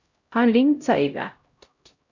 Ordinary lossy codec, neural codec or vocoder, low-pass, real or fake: Opus, 64 kbps; codec, 16 kHz, 0.5 kbps, X-Codec, HuBERT features, trained on LibriSpeech; 7.2 kHz; fake